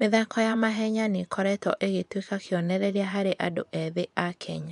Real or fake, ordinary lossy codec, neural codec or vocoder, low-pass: fake; none; vocoder, 24 kHz, 100 mel bands, Vocos; 10.8 kHz